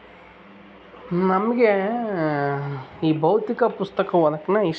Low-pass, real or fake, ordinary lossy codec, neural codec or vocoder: none; real; none; none